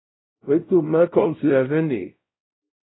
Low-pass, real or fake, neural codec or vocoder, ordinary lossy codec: 7.2 kHz; fake; codec, 16 kHz, 0.5 kbps, X-Codec, WavLM features, trained on Multilingual LibriSpeech; AAC, 16 kbps